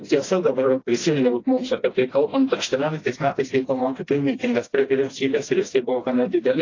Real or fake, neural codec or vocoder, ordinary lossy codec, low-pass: fake; codec, 16 kHz, 1 kbps, FreqCodec, smaller model; AAC, 32 kbps; 7.2 kHz